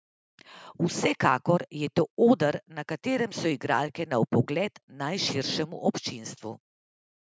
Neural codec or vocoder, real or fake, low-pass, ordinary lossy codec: none; real; none; none